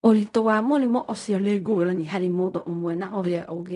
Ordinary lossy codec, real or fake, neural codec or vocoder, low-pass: none; fake; codec, 16 kHz in and 24 kHz out, 0.4 kbps, LongCat-Audio-Codec, fine tuned four codebook decoder; 10.8 kHz